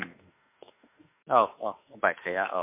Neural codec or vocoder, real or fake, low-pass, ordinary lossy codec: autoencoder, 48 kHz, 32 numbers a frame, DAC-VAE, trained on Japanese speech; fake; 3.6 kHz; MP3, 24 kbps